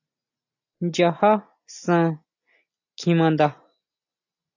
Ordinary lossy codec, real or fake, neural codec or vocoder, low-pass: AAC, 48 kbps; real; none; 7.2 kHz